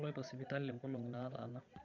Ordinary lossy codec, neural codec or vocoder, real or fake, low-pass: none; vocoder, 22.05 kHz, 80 mel bands, Vocos; fake; 7.2 kHz